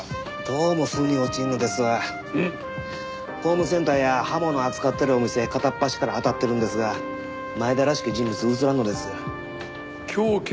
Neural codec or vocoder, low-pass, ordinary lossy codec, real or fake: none; none; none; real